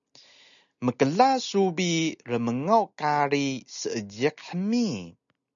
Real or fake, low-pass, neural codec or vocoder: real; 7.2 kHz; none